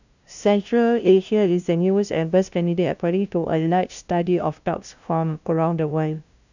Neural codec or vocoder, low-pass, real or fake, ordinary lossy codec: codec, 16 kHz, 0.5 kbps, FunCodec, trained on LibriTTS, 25 frames a second; 7.2 kHz; fake; none